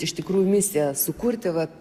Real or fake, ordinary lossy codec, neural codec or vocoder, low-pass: real; Opus, 64 kbps; none; 14.4 kHz